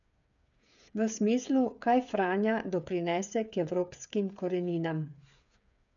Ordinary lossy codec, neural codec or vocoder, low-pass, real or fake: none; codec, 16 kHz, 8 kbps, FreqCodec, smaller model; 7.2 kHz; fake